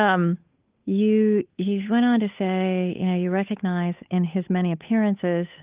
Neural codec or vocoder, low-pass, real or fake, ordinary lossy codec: codec, 16 kHz, 8 kbps, FunCodec, trained on Chinese and English, 25 frames a second; 3.6 kHz; fake; Opus, 32 kbps